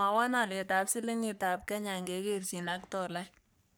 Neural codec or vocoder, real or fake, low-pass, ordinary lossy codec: codec, 44.1 kHz, 3.4 kbps, Pupu-Codec; fake; none; none